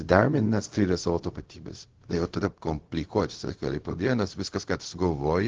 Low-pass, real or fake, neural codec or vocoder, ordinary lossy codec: 7.2 kHz; fake; codec, 16 kHz, 0.4 kbps, LongCat-Audio-Codec; Opus, 16 kbps